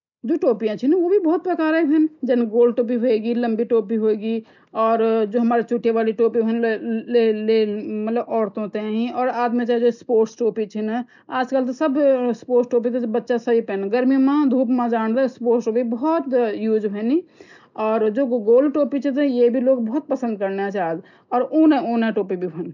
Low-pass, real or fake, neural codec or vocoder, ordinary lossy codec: 7.2 kHz; real; none; none